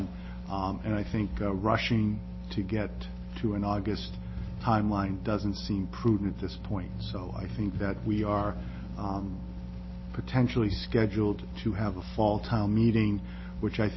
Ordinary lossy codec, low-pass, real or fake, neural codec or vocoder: MP3, 24 kbps; 7.2 kHz; real; none